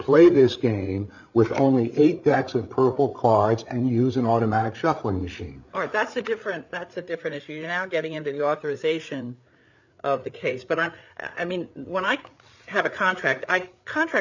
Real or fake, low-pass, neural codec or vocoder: fake; 7.2 kHz; codec, 16 kHz, 8 kbps, FreqCodec, larger model